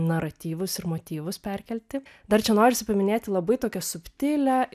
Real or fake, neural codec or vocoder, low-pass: real; none; 14.4 kHz